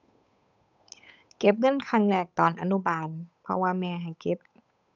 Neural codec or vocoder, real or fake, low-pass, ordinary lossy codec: codec, 16 kHz, 8 kbps, FunCodec, trained on Chinese and English, 25 frames a second; fake; 7.2 kHz; none